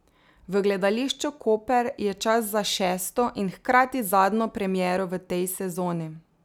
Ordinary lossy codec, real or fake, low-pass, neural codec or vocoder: none; real; none; none